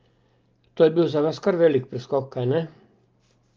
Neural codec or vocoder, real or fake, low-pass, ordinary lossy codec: none; real; 7.2 kHz; Opus, 24 kbps